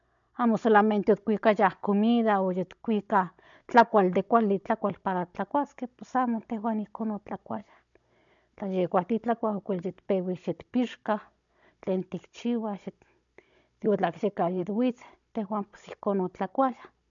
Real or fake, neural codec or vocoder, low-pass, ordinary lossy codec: fake; codec, 16 kHz, 16 kbps, FunCodec, trained on Chinese and English, 50 frames a second; 7.2 kHz; none